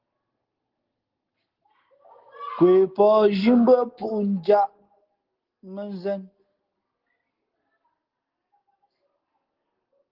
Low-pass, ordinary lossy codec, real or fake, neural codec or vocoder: 5.4 kHz; Opus, 16 kbps; real; none